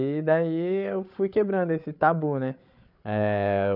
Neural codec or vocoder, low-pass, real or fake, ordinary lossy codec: codec, 16 kHz, 8 kbps, FreqCodec, larger model; 5.4 kHz; fake; none